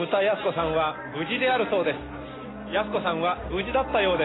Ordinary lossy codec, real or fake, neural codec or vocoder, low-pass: AAC, 16 kbps; real; none; 7.2 kHz